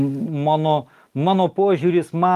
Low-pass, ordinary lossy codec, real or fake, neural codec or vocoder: 14.4 kHz; Opus, 32 kbps; real; none